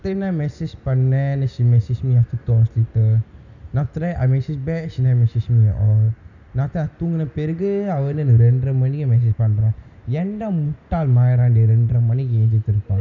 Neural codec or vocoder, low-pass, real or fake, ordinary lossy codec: none; 7.2 kHz; real; none